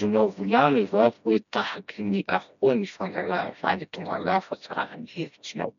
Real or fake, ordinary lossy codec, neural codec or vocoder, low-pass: fake; none; codec, 16 kHz, 1 kbps, FreqCodec, smaller model; 7.2 kHz